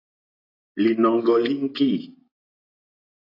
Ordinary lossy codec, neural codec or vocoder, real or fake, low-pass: AAC, 24 kbps; vocoder, 24 kHz, 100 mel bands, Vocos; fake; 5.4 kHz